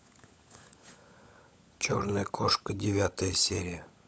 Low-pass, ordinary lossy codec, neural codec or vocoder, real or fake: none; none; codec, 16 kHz, 16 kbps, FunCodec, trained on LibriTTS, 50 frames a second; fake